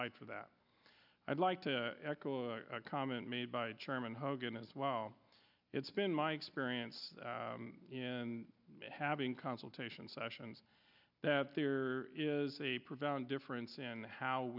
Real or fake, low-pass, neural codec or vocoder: real; 5.4 kHz; none